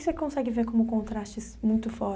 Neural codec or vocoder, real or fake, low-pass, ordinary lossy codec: none; real; none; none